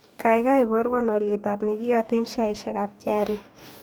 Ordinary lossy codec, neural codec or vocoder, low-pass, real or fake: none; codec, 44.1 kHz, 2.6 kbps, DAC; none; fake